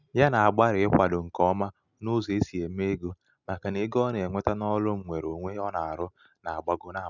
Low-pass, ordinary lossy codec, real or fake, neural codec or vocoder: 7.2 kHz; none; real; none